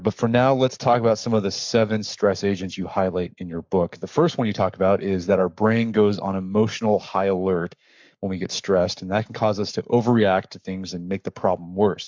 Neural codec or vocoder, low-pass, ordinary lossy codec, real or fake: codec, 44.1 kHz, 7.8 kbps, Pupu-Codec; 7.2 kHz; MP3, 64 kbps; fake